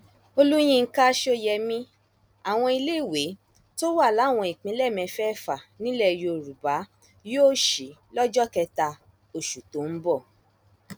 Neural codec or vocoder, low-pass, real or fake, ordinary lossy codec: none; none; real; none